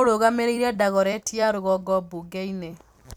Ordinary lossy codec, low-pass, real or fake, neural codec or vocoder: none; none; fake; vocoder, 44.1 kHz, 128 mel bands every 256 samples, BigVGAN v2